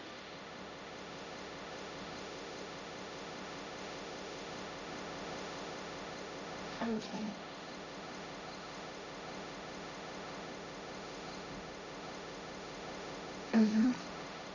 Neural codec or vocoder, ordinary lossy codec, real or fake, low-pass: codec, 16 kHz, 1.1 kbps, Voila-Tokenizer; none; fake; 7.2 kHz